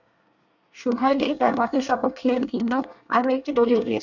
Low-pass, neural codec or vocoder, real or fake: 7.2 kHz; codec, 24 kHz, 1 kbps, SNAC; fake